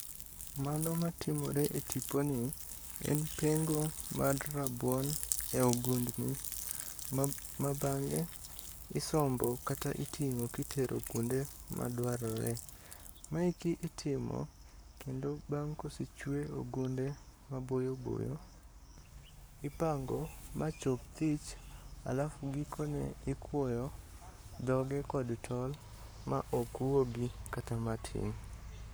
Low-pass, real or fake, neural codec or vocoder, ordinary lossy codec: none; fake; codec, 44.1 kHz, 7.8 kbps, Pupu-Codec; none